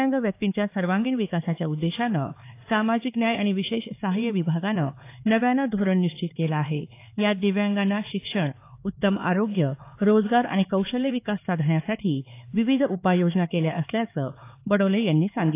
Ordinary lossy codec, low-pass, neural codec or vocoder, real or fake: AAC, 24 kbps; 3.6 kHz; codec, 16 kHz, 4 kbps, X-Codec, HuBERT features, trained on LibriSpeech; fake